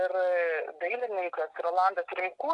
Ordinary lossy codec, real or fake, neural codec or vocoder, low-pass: AAC, 48 kbps; real; none; 10.8 kHz